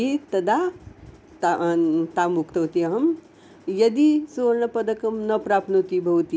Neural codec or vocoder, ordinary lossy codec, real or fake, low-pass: none; none; real; none